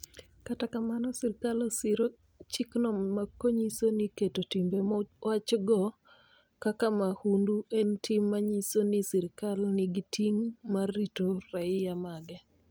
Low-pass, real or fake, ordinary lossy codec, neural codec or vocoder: none; real; none; none